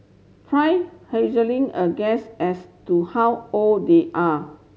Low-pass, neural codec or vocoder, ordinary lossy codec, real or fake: none; none; none; real